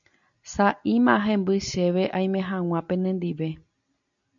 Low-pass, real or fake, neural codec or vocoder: 7.2 kHz; real; none